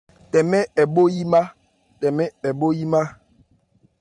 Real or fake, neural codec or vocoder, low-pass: fake; vocoder, 44.1 kHz, 128 mel bands every 512 samples, BigVGAN v2; 10.8 kHz